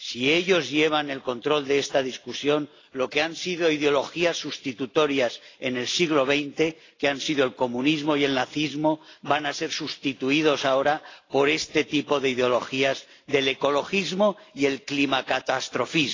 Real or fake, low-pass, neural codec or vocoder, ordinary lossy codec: real; 7.2 kHz; none; AAC, 32 kbps